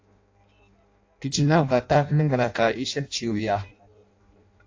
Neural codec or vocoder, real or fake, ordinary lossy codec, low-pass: codec, 16 kHz in and 24 kHz out, 0.6 kbps, FireRedTTS-2 codec; fake; AAC, 48 kbps; 7.2 kHz